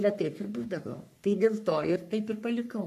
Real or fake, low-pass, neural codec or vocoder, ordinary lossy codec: fake; 14.4 kHz; codec, 44.1 kHz, 3.4 kbps, Pupu-Codec; AAC, 96 kbps